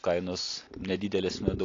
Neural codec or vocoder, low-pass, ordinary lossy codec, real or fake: none; 7.2 kHz; AAC, 32 kbps; real